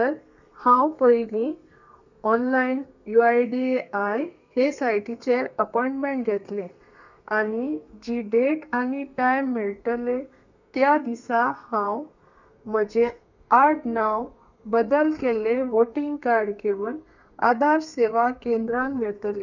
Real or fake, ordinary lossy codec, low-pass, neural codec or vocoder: fake; none; 7.2 kHz; codec, 32 kHz, 1.9 kbps, SNAC